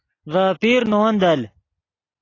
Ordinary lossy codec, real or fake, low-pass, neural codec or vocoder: AAC, 32 kbps; real; 7.2 kHz; none